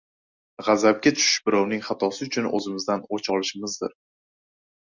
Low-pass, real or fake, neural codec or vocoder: 7.2 kHz; real; none